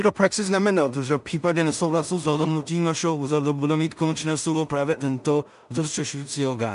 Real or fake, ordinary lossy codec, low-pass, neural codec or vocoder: fake; MP3, 96 kbps; 10.8 kHz; codec, 16 kHz in and 24 kHz out, 0.4 kbps, LongCat-Audio-Codec, two codebook decoder